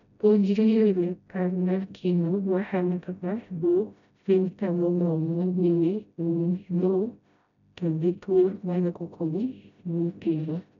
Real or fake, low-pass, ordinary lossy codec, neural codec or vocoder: fake; 7.2 kHz; none; codec, 16 kHz, 0.5 kbps, FreqCodec, smaller model